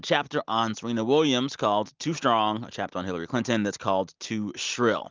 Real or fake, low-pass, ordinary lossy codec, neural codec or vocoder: real; 7.2 kHz; Opus, 24 kbps; none